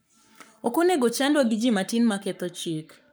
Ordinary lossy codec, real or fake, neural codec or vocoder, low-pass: none; fake; codec, 44.1 kHz, 7.8 kbps, Pupu-Codec; none